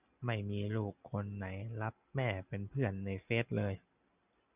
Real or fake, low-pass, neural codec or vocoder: real; 3.6 kHz; none